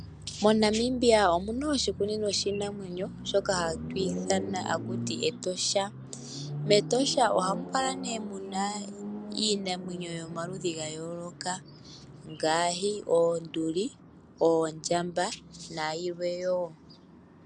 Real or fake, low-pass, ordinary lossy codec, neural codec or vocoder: real; 9.9 kHz; MP3, 96 kbps; none